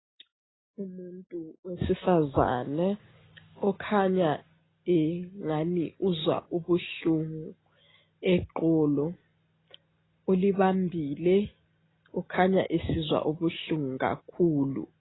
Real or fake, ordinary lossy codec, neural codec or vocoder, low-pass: real; AAC, 16 kbps; none; 7.2 kHz